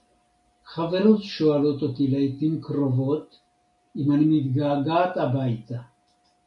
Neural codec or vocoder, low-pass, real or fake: none; 10.8 kHz; real